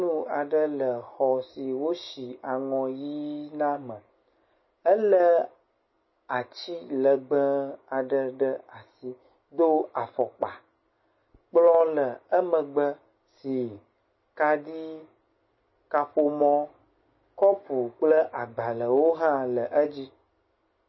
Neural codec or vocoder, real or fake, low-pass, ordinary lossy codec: none; real; 7.2 kHz; MP3, 24 kbps